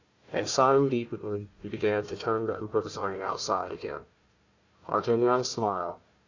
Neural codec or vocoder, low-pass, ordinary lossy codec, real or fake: codec, 16 kHz, 1 kbps, FunCodec, trained on Chinese and English, 50 frames a second; 7.2 kHz; Opus, 64 kbps; fake